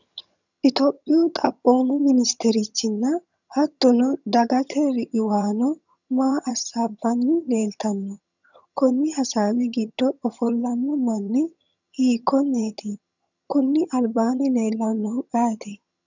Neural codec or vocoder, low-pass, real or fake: vocoder, 22.05 kHz, 80 mel bands, HiFi-GAN; 7.2 kHz; fake